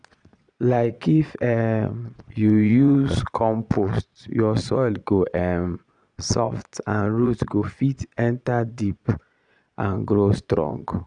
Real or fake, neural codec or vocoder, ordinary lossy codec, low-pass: fake; vocoder, 22.05 kHz, 80 mel bands, Vocos; none; 9.9 kHz